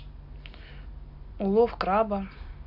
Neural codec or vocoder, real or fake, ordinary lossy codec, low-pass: none; real; none; 5.4 kHz